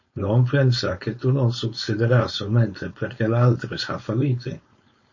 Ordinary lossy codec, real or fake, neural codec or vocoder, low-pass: MP3, 32 kbps; fake; codec, 16 kHz, 4.8 kbps, FACodec; 7.2 kHz